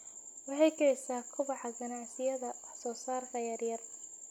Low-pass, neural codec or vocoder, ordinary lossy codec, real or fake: 19.8 kHz; none; none; real